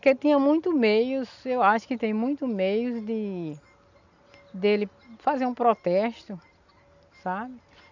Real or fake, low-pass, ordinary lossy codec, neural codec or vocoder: real; 7.2 kHz; none; none